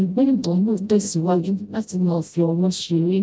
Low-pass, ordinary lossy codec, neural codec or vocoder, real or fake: none; none; codec, 16 kHz, 0.5 kbps, FreqCodec, smaller model; fake